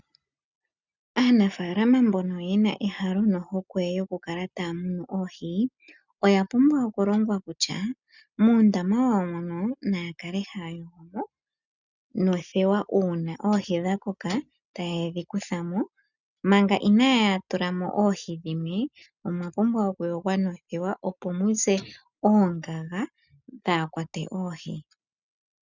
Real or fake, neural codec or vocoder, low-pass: real; none; 7.2 kHz